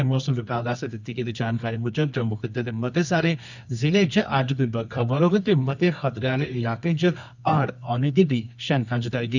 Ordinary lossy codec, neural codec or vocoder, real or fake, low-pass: none; codec, 24 kHz, 0.9 kbps, WavTokenizer, medium music audio release; fake; 7.2 kHz